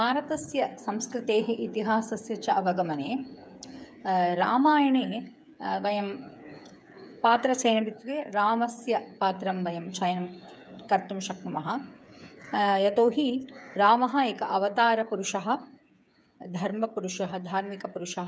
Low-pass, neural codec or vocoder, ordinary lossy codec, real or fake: none; codec, 16 kHz, 8 kbps, FreqCodec, smaller model; none; fake